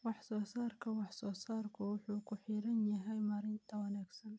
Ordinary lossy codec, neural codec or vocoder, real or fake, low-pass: none; none; real; none